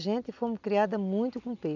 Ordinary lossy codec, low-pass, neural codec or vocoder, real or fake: none; 7.2 kHz; none; real